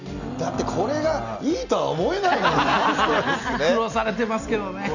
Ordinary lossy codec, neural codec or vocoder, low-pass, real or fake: none; none; 7.2 kHz; real